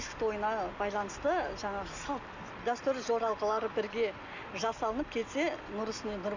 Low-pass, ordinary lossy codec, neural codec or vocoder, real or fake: 7.2 kHz; none; none; real